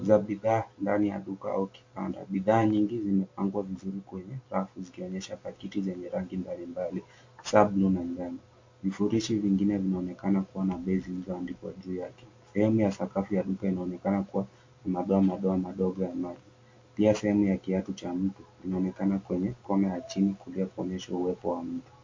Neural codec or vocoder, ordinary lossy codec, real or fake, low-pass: none; MP3, 48 kbps; real; 7.2 kHz